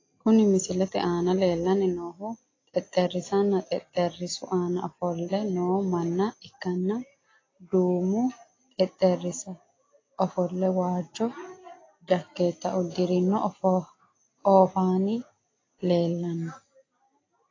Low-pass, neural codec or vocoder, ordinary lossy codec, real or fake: 7.2 kHz; none; AAC, 32 kbps; real